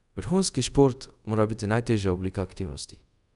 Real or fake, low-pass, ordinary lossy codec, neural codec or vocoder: fake; 10.8 kHz; none; codec, 24 kHz, 0.5 kbps, DualCodec